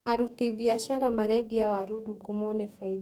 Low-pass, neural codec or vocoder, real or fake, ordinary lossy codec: 19.8 kHz; codec, 44.1 kHz, 2.6 kbps, DAC; fake; none